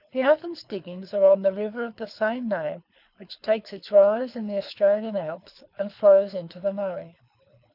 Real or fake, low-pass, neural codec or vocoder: fake; 5.4 kHz; codec, 24 kHz, 6 kbps, HILCodec